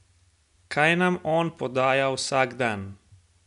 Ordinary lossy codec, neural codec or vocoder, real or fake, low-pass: none; none; real; 10.8 kHz